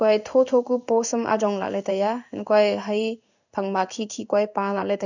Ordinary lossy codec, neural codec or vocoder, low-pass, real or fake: none; codec, 16 kHz in and 24 kHz out, 1 kbps, XY-Tokenizer; 7.2 kHz; fake